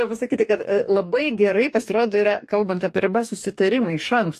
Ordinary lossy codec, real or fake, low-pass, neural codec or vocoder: AAC, 64 kbps; fake; 14.4 kHz; codec, 44.1 kHz, 2.6 kbps, DAC